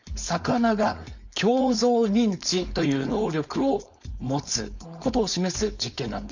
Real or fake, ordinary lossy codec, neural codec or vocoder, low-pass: fake; none; codec, 16 kHz, 4.8 kbps, FACodec; 7.2 kHz